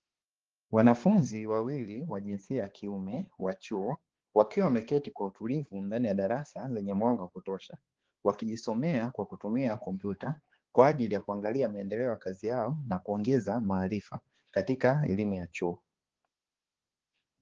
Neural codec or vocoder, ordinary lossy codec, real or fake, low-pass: codec, 16 kHz, 2 kbps, X-Codec, HuBERT features, trained on balanced general audio; Opus, 16 kbps; fake; 7.2 kHz